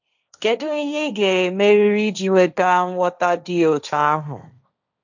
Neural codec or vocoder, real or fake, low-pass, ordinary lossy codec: codec, 16 kHz, 1.1 kbps, Voila-Tokenizer; fake; 7.2 kHz; none